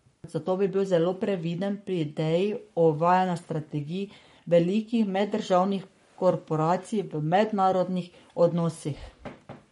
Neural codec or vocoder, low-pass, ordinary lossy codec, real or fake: codec, 44.1 kHz, 7.8 kbps, Pupu-Codec; 19.8 kHz; MP3, 48 kbps; fake